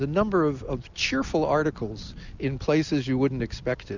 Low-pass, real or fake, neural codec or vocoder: 7.2 kHz; real; none